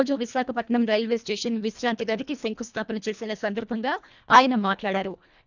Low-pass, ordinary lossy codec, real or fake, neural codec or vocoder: 7.2 kHz; none; fake; codec, 24 kHz, 1.5 kbps, HILCodec